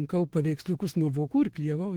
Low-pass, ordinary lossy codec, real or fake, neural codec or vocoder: 19.8 kHz; Opus, 16 kbps; fake; autoencoder, 48 kHz, 32 numbers a frame, DAC-VAE, trained on Japanese speech